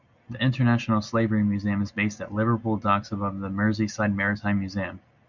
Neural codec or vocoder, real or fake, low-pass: none; real; 7.2 kHz